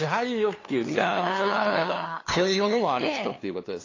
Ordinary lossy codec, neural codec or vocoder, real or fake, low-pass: AAC, 32 kbps; codec, 16 kHz, 2 kbps, FunCodec, trained on LibriTTS, 25 frames a second; fake; 7.2 kHz